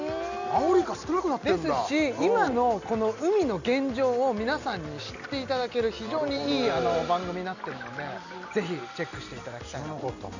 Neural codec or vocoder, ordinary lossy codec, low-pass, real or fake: none; none; 7.2 kHz; real